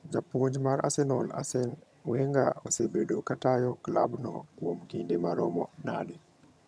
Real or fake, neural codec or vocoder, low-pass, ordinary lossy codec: fake; vocoder, 22.05 kHz, 80 mel bands, HiFi-GAN; none; none